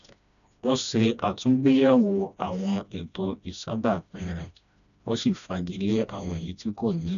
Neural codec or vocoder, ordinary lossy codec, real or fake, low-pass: codec, 16 kHz, 1 kbps, FreqCodec, smaller model; none; fake; 7.2 kHz